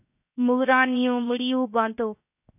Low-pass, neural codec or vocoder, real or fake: 3.6 kHz; codec, 16 kHz, 0.7 kbps, FocalCodec; fake